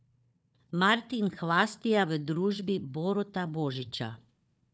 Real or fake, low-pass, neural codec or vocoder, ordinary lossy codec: fake; none; codec, 16 kHz, 4 kbps, FunCodec, trained on Chinese and English, 50 frames a second; none